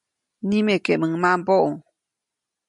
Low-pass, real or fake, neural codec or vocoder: 10.8 kHz; real; none